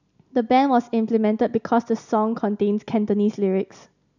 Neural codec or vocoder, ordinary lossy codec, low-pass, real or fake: none; none; 7.2 kHz; real